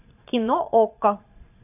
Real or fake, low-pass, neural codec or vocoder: fake; 3.6 kHz; vocoder, 22.05 kHz, 80 mel bands, Vocos